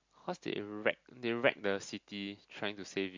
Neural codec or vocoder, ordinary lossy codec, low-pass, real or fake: none; MP3, 48 kbps; 7.2 kHz; real